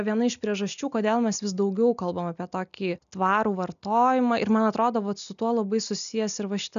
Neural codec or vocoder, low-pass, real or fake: none; 7.2 kHz; real